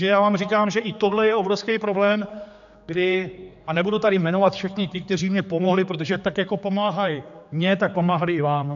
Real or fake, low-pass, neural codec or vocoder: fake; 7.2 kHz; codec, 16 kHz, 4 kbps, X-Codec, HuBERT features, trained on general audio